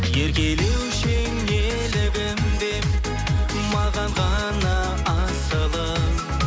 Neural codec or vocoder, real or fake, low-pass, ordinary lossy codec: none; real; none; none